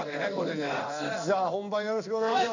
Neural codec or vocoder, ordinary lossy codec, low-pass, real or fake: codec, 16 kHz in and 24 kHz out, 1 kbps, XY-Tokenizer; none; 7.2 kHz; fake